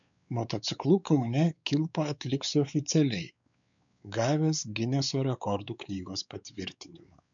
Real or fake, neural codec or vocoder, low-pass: fake; codec, 16 kHz, 4 kbps, X-Codec, WavLM features, trained on Multilingual LibriSpeech; 7.2 kHz